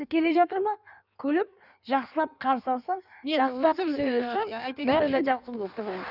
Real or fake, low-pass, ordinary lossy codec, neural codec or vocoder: fake; 5.4 kHz; none; codec, 16 kHz in and 24 kHz out, 1.1 kbps, FireRedTTS-2 codec